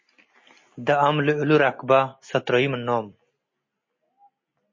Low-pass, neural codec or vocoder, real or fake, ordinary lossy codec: 7.2 kHz; none; real; MP3, 32 kbps